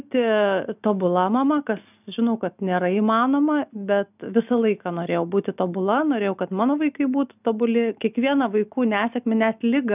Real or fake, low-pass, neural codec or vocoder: real; 3.6 kHz; none